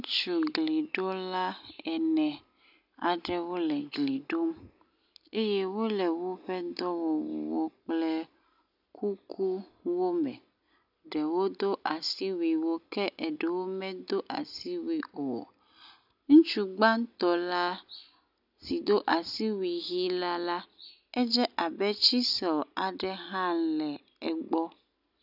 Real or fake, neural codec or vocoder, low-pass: real; none; 5.4 kHz